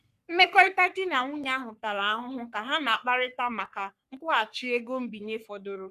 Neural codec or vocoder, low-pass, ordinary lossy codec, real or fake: codec, 44.1 kHz, 3.4 kbps, Pupu-Codec; 14.4 kHz; none; fake